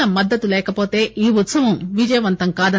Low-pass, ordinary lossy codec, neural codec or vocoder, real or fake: none; none; none; real